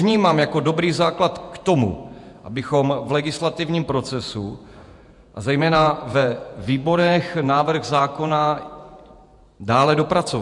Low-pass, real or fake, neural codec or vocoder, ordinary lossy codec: 10.8 kHz; fake; vocoder, 48 kHz, 128 mel bands, Vocos; MP3, 64 kbps